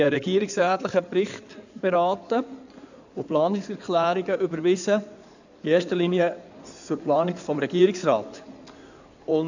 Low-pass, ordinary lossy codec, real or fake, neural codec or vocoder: 7.2 kHz; none; fake; codec, 16 kHz in and 24 kHz out, 2.2 kbps, FireRedTTS-2 codec